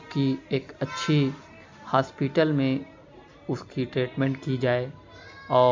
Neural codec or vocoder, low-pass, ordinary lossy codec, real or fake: none; 7.2 kHz; MP3, 64 kbps; real